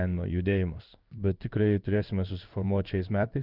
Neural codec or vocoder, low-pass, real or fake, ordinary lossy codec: codec, 16 kHz in and 24 kHz out, 1 kbps, XY-Tokenizer; 5.4 kHz; fake; Opus, 32 kbps